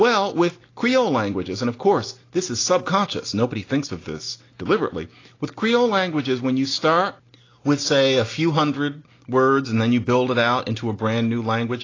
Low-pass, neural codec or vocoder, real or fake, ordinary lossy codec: 7.2 kHz; none; real; AAC, 32 kbps